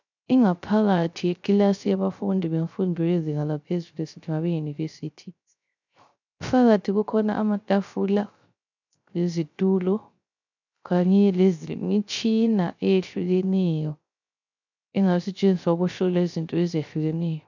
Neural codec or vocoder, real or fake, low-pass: codec, 16 kHz, 0.3 kbps, FocalCodec; fake; 7.2 kHz